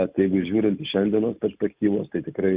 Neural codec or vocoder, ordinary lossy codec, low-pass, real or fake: none; Opus, 64 kbps; 3.6 kHz; real